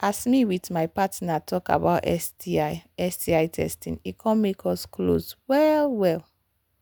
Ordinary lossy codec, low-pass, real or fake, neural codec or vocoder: none; none; real; none